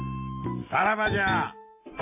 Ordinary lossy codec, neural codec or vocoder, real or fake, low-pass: AAC, 32 kbps; none; real; 3.6 kHz